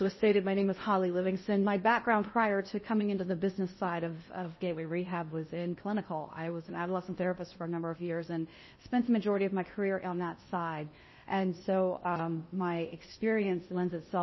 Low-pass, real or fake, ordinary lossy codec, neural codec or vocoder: 7.2 kHz; fake; MP3, 24 kbps; codec, 16 kHz in and 24 kHz out, 0.8 kbps, FocalCodec, streaming, 65536 codes